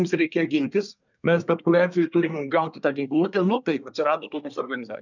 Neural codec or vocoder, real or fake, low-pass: codec, 24 kHz, 1 kbps, SNAC; fake; 7.2 kHz